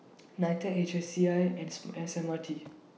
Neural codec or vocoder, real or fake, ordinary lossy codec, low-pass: none; real; none; none